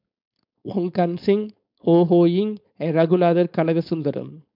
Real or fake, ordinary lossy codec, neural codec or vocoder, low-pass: fake; MP3, 48 kbps; codec, 16 kHz, 4.8 kbps, FACodec; 5.4 kHz